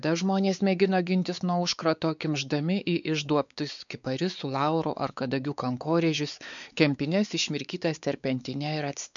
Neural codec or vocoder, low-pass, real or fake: codec, 16 kHz, 4 kbps, X-Codec, WavLM features, trained on Multilingual LibriSpeech; 7.2 kHz; fake